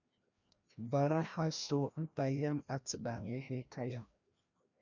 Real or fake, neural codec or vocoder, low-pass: fake; codec, 16 kHz, 1 kbps, FreqCodec, larger model; 7.2 kHz